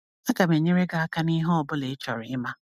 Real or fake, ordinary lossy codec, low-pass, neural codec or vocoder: real; MP3, 96 kbps; 14.4 kHz; none